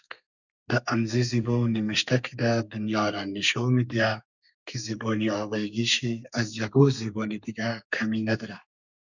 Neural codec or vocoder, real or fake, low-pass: codec, 32 kHz, 1.9 kbps, SNAC; fake; 7.2 kHz